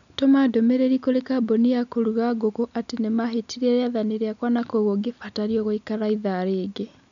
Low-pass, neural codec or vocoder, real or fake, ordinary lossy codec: 7.2 kHz; none; real; none